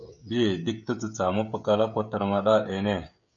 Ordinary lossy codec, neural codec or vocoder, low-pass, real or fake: MP3, 96 kbps; codec, 16 kHz, 16 kbps, FreqCodec, smaller model; 7.2 kHz; fake